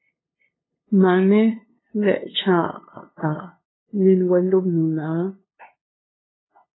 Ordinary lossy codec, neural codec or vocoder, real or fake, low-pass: AAC, 16 kbps; codec, 16 kHz, 2 kbps, FunCodec, trained on LibriTTS, 25 frames a second; fake; 7.2 kHz